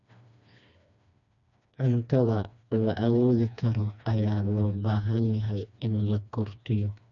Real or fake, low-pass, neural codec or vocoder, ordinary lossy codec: fake; 7.2 kHz; codec, 16 kHz, 2 kbps, FreqCodec, smaller model; none